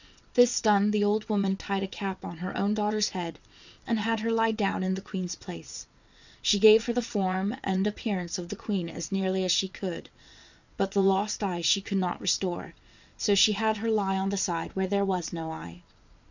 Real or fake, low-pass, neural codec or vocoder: fake; 7.2 kHz; vocoder, 22.05 kHz, 80 mel bands, WaveNeXt